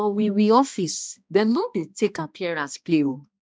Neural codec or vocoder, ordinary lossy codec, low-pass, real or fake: codec, 16 kHz, 1 kbps, X-Codec, HuBERT features, trained on balanced general audio; none; none; fake